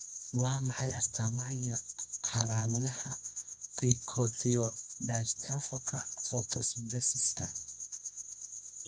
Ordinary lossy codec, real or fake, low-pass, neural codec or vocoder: none; fake; 9.9 kHz; codec, 24 kHz, 0.9 kbps, WavTokenizer, medium music audio release